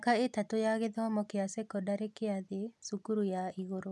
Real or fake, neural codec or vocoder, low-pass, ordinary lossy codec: real; none; none; none